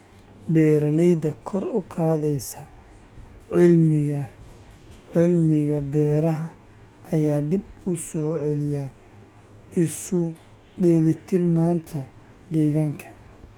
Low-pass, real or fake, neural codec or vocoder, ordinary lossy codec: 19.8 kHz; fake; codec, 44.1 kHz, 2.6 kbps, DAC; none